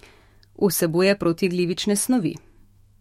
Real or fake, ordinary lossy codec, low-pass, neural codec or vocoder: fake; MP3, 64 kbps; 19.8 kHz; autoencoder, 48 kHz, 128 numbers a frame, DAC-VAE, trained on Japanese speech